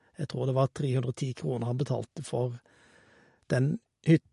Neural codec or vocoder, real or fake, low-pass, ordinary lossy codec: none; real; 14.4 kHz; MP3, 48 kbps